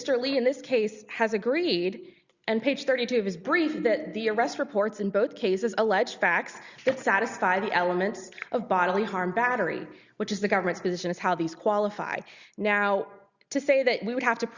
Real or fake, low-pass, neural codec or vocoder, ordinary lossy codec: real; 7.2 kHz; none; Opus, 64 kbps